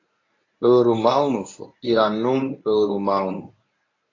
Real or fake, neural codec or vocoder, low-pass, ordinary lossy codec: fake; codec, 24 kHz, 0.9 kbps, WavTokenizer, medium speech release version 1; 7.2 kHz; AAC, 32 kbps